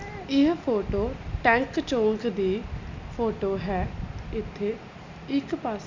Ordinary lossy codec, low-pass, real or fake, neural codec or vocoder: MP3, 64 kbps; 7.2 kHz; real; none